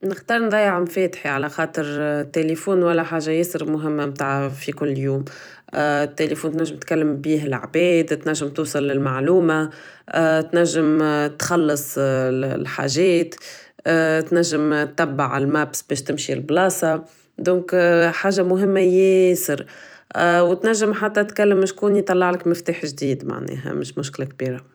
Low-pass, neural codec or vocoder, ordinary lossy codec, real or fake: none; vocoder, 44.1 kHz, 128 mel bands every 256 samples, BigVGAN v2; none; fake